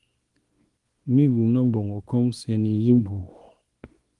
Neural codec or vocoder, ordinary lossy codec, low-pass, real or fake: codec, 24 kHz, 0.9 kbps, WavTokenizer, small release; Opus, 24 kbps; 10.8 kHz; fake